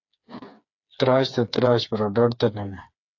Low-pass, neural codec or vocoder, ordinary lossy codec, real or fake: 7.2 kHz; codec, 16 kHz, 4 kbps, FreqCodec, smaller model; AAC, 48 kbps; fake